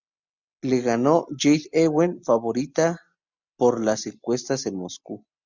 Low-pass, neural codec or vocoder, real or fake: 7.2 kHz; none; real